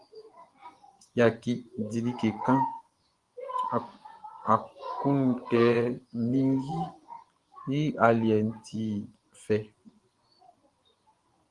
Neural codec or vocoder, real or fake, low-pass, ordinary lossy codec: vocoder, 24 kHz, 100 mel bands, Vocos; fake; 10.8 kHz; Opus, 24 kbps